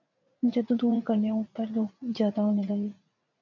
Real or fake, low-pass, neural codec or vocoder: fake; 7.2 kHz; vocoder, 44.1 kHz, 80 mel bands, Vocos